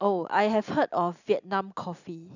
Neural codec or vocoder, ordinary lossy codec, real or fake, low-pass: none; none; real; 7.2 kHz